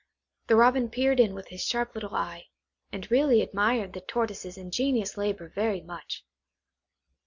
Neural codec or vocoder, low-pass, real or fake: none; 7.2 kHz; real